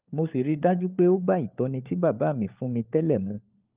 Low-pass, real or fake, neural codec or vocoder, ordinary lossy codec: 3.6 kHz; fake; codec, 16 kHz, 4 kbps, FunCodec, trained on LibriTTS, 50 frames a second; Opus, 24 kbps